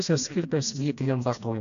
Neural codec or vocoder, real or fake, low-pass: codec, 16 kHz, 1 kbps, FreqCodec, smaller model; fake; 7.2 kHz